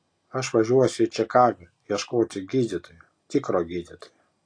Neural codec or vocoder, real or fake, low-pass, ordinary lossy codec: none; real; 9.9 kHz; AAC, 48 kbps